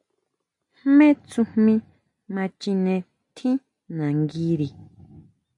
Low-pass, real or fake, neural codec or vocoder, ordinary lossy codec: 10.8 kHz; real; none; AAC, 64 kbps